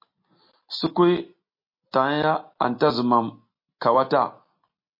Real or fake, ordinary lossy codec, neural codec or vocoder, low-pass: real; MP3, 32 kbps; none; 5.4 kHz